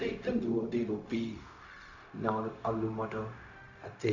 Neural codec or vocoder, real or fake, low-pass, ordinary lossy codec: codec, 16 kHz, 0.4 kbps, LongCat-Audio-Codec; fake; 7.2 kHz; none